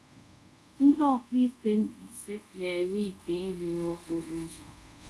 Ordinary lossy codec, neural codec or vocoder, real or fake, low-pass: none; codec, 24 kHz, 0.5 kbps, DualCodec; fake; none